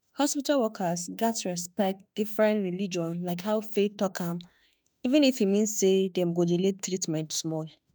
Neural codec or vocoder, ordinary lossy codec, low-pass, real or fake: autoencoder, 48 kHz, 32 numbers a frame, DAC-VAE, trained on Japanese speech; none; none; fake